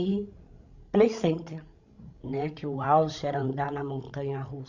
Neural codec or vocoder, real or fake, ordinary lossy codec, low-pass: codec, 16 kHz, 16 kbps, FreqCodec, larger model; fake; Opus, 64 kbps; 7.2 kHz